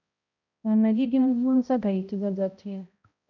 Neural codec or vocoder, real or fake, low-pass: codec, 16 kHz, 0.5 kbps, X-Codec, HuBERT features, trained on balanced general audio; fake; 7.2 kHz